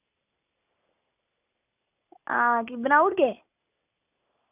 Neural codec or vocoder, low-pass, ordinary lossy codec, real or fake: none; 3.6 kHz; none; real